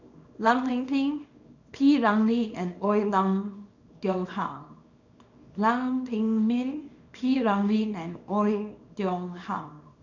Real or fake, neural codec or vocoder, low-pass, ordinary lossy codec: fake; codec, 24 kHz, 0.9 kbps, WavTokenizer, small release; 7.2 kHz; none